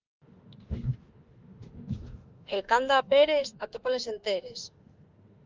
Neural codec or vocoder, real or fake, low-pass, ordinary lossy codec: autoencoder, 48 kHz, 32 numbers a frame, DAC-VAE, trained on Japanese speech; fake; 7.2 kHz; Opus, 24 kbps